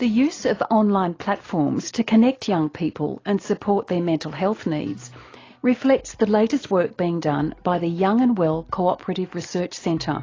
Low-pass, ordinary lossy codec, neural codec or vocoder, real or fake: 7.2 kHz; AAC, 32 kbps; none; real